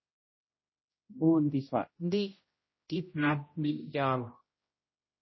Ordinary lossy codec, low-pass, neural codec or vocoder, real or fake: MP3, 24 kbps; 7.2 kHz; codec, 16 kHz, 0.5 kbps, X-Codec, HuBERT features, trained on general audio; fake